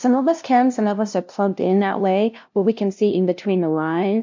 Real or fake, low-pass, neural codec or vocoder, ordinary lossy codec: fake; 7.2 kHz; codec, 16 kHz, 0.5 kbps, FunCodec, trained on LibriTTS, 25 frames a second; MP3, 48 kbps